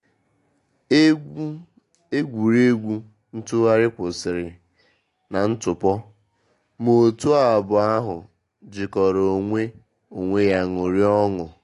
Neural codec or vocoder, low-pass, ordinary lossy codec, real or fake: none; 10.8 kHz; AAC, 48 kbps; real